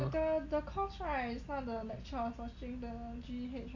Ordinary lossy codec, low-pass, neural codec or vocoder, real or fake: MP3, 48 kbps; 7.2 kHz; none; real